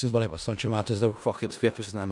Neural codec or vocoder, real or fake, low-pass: codec, 16 kHz in and 24 kHz out, 0.4 kbps, LongCat-Audio-Codec, four codebook decoder; fake; 10.8 kHz